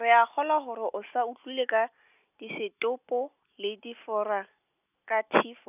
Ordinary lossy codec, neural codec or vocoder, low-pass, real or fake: none; none; 3.6 kHz; real